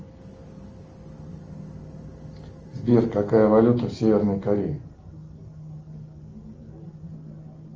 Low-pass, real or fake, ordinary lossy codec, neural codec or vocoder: 7.2 kHz; real; Opus, 24 kbps; none